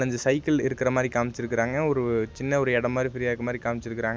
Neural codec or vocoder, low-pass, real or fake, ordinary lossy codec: none; none; real; none